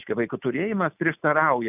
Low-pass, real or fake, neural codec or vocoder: 3.6 kHz; real; none